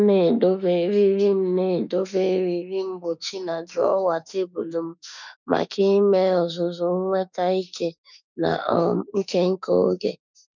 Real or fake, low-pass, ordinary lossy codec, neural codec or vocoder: fake; 7.2 kHz; none; autoencoder, 48 kHz, 32 numbers a frame, DAC-VAE, trained on Japanese speech